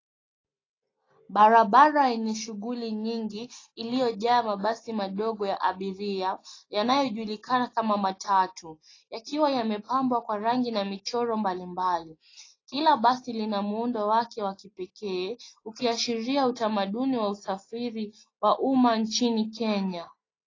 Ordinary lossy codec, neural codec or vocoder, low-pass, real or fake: AAC, 32 kbps; none; 7.2 kHz; real